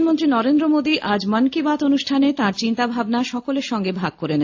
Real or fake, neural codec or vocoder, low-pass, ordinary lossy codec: real; none; 7.2 kHz; AAC, 48 kbps